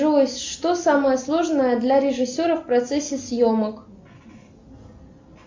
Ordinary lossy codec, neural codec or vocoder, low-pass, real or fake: MP3, 48 kbps; none; 7.2 kHz; real